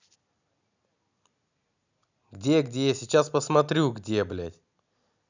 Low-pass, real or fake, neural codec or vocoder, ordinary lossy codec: 7.2 kHz; real; none; none